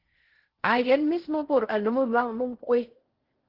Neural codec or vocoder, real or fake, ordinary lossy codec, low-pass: codec, 16 kHz in and 24 kHz out, 0.6 kbps, FocalCodec, streaming, 4096 codes; fake; Opus, 16 kbps; 5.4 kHz